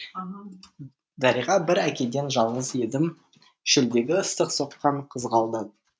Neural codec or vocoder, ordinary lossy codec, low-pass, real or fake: none; none; none; real